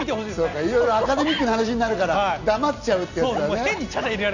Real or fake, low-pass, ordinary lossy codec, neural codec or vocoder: real; 7.2 kHz; none; none